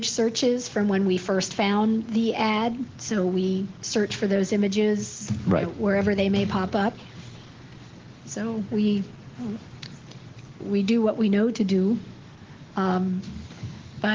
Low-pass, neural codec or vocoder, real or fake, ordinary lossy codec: 7.2 kHz; none; real; Opus, 16 kbps